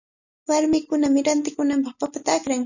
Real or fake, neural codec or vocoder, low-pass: real; none; 7.2 kHz